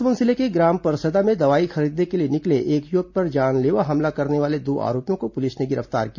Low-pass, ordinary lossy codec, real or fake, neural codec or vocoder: 7.2 kHz; none; real; none